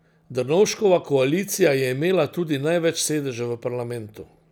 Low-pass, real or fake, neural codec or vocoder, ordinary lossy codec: none; real; none; none